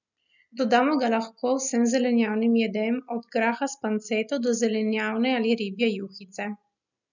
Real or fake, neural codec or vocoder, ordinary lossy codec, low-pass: real; none; none; 7.2 kHz